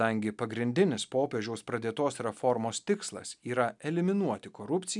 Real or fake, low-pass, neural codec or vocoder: real; 10.8 kHz; none